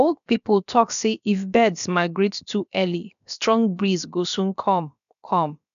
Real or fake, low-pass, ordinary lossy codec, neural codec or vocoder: fake; 7.2 kHz; none; codec, 16 kHz, about 1 kbps, DyCAST, with the encoder's durations